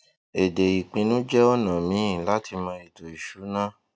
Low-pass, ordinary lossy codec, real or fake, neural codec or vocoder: none; none; real; none